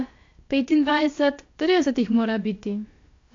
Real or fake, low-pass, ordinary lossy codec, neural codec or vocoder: fake; 7.2 kHz; AAC, 48 kbps; codec, 16 kHz, about 1 kbps, DyCAST, with the encoder's durations